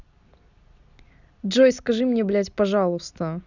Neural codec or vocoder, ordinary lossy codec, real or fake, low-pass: none; none; real; 7.2 kHz